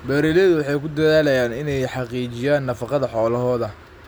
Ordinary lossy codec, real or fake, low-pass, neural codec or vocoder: none; real; none; none